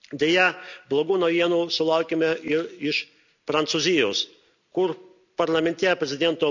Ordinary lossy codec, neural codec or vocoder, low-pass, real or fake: none; none; 7.2 kHz; real